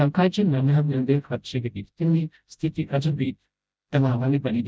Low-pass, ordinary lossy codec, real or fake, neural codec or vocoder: none; none; fake; codec, 16 kHz, 0.5 kbps, FreqCodec, smaller model